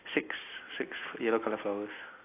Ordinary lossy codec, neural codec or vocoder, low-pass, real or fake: AAC, 24 kbps; none; 3.6 kHz; real